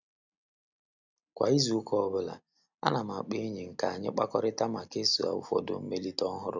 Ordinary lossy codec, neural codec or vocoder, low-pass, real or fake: none; none; 7.2 kHz; real